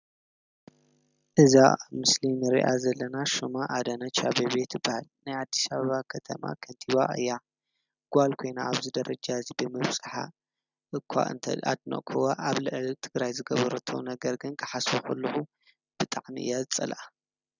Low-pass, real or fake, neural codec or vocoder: 7.2 kHz; real; none